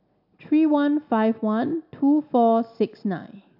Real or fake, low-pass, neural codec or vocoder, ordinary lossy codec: real; 5.4 kHz; none; AAC, 48 kbps